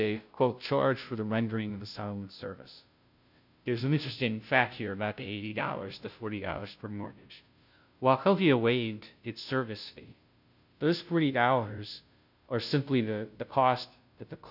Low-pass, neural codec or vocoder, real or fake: 5.4 kHz; codec, 16 kHz, 0.5 kbps, FunCodec, trained on Chinese and English, 25 frames a second; fake